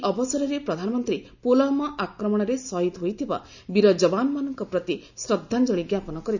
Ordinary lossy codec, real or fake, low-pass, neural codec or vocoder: none; real; 7.2 kHz; none